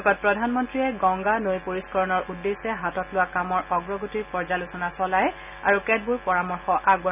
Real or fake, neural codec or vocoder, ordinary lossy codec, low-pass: real; none; none; 3.6 kHz